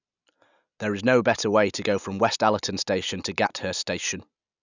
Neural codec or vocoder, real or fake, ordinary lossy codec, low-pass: none; real; none; 7.2 kHz